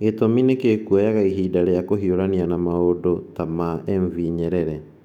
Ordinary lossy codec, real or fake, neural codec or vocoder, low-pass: none; fake; autoencoder, 48 kHz, 128 numbers a frame, DAC-VAE, trained on Japanese speech; 19.8 kHz